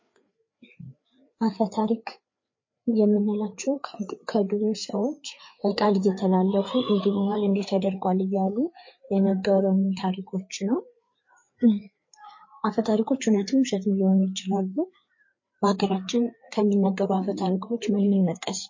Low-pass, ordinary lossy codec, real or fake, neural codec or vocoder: 7.2 kHz; MP3, 32 kbps; fake; codec, 16 kHz, 4 kbps, FreqCodec, larger model